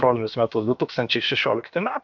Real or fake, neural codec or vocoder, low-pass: fake; codec, 16 kHz, about 1 kbps, DyCAST, with the encoder's durations; 7.2 kHz